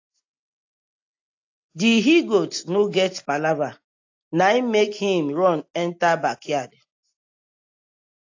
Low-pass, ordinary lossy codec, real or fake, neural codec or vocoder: 7.2 kHz; AAC, 48 kbps; real; none